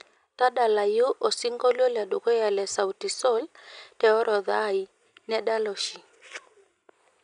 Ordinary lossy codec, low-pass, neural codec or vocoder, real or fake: none; 9.9 kHz; none; real